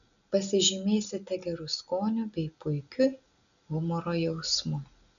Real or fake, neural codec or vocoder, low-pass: real; none; 7.2 kHz